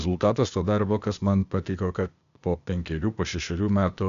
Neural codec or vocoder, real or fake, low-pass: codec, 16 kHz, 0.8 kbps, ZipCodec; fake; 7.2 kHz